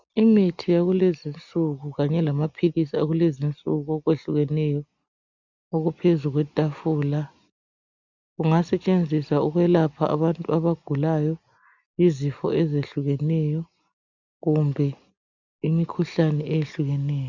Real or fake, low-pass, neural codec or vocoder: real; 7.2 kHz; none